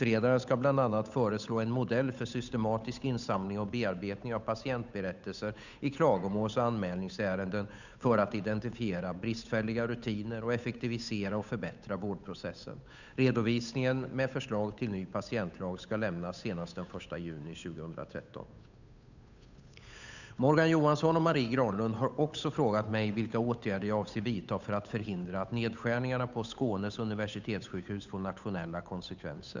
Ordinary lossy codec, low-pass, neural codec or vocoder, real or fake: none; 7.2 kHz; codec, 16 kHz, 8 kbps, FunCodec, trained on Chinese and English, 25 frames a second; fake